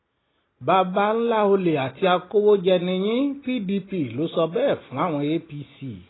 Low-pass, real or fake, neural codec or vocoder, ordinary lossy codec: 7.2 kHz; real; none; AAC, 16 kbps